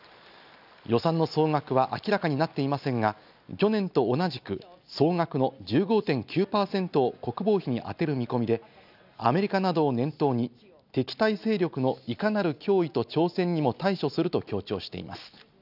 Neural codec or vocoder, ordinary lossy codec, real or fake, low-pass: none; none; real; 5.4 kHz